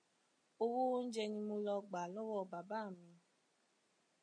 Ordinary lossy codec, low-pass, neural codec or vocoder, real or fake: MP3, 48 kbps; 9.9 kHz; none; real